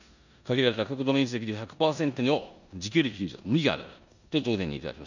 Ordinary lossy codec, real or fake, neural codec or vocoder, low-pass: none; fake; codec, 16 kHz in and 24 kHz out, 0.9 kbps, LongCat-Audio-Codec, four codebook decoder; 7.2 kHz